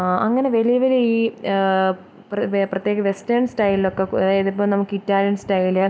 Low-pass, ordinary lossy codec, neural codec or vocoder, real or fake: none; none; none; real